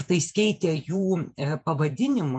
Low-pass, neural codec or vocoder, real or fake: 9.9 kHz; vocoder, 48 kHz, 128 mel bands, Vocos; fake